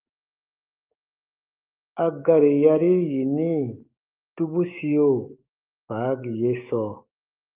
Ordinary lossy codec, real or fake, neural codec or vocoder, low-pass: Opus, 24 kbps; fake; codec, 16 kHz, 6 kbps, DAC; 3.6 kHz